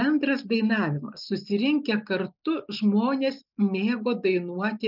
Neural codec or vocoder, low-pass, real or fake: none; 5.4 kHz; real